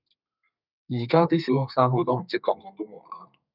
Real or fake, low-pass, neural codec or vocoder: fake; 5.4 kHz; codec, 32 kHz, 1.9 kbps, SNAC